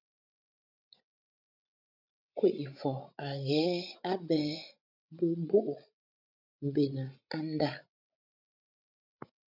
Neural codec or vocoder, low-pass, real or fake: codec, 16 kHz, 16 kbps, FreqCodec, larger model; 5.4 kHz; fake